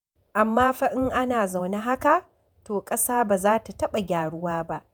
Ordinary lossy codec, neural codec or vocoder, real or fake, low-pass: none; vocoder, 48 kHz, 128 mel bands, Vocos; fake; none